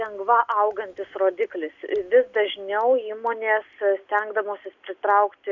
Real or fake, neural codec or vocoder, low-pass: real; none; 7.2 kHz